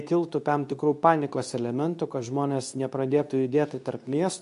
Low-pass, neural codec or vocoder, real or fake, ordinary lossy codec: 10.8 kHz; codec, 24 kHz, 0.9 kbps, WavTokenizer, medium speech release version 2; fake; AAC, 64 kbps